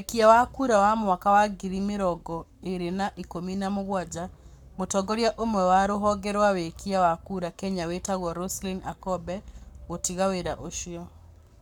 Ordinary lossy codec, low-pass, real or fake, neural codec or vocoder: none; 19.8 kHz; fake; codec, 44.1 kHz, 7.8 kbps, Pupu-Codec